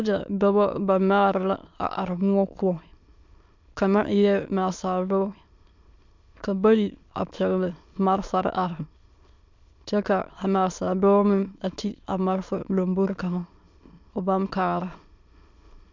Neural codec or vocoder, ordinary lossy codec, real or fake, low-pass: autoencoder, 22.05 kHz, a latent of 192 numbers a frame, VITS, trained on many speakers; MP3, 64 kbps; fake; 7.2 kHz